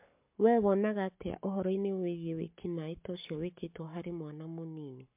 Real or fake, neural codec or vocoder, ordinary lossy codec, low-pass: fake; codec, 44.1 kHz, 7.8 kbps, Pupu-Codec; MP3, 32 kbps; 3.6 kHz